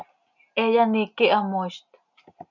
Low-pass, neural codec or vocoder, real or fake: 7.2 kHz; none; real